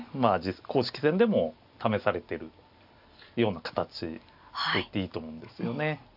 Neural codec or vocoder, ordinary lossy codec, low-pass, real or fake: none; AAC, 48 kbps; 5.4 kHz; real